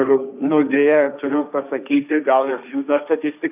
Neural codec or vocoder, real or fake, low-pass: codec, 16 kHz, 1.1 kbps, Voila-Tokenizer; fake; 3.6 kHz